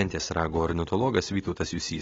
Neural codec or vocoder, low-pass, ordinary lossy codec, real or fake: none; 7.2 kHz; AAC, 24 kbps; real